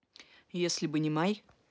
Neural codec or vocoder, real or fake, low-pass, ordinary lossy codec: none; real; none; none